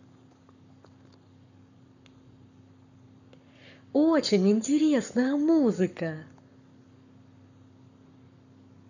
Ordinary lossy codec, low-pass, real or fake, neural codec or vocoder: none; 7.2 kHz; fake; codec, 44.1 kHz, 7.8 kbps, Pupu-Codec